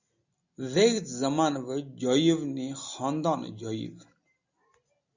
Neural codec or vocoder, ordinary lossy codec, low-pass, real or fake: none; Opus, 64 kbps; 7.2 kHz; real